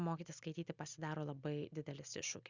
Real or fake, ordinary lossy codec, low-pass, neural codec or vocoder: real; Opus, 64 kbps; 7.2 kHz; none